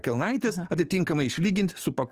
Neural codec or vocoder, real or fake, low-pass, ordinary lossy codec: codec, 44.1 kHz, 7.8 kbps, DAC; fake; 14.4 kHz; Opus, 24 kbps